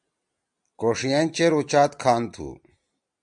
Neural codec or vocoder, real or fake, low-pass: none; real; 9.9 kHz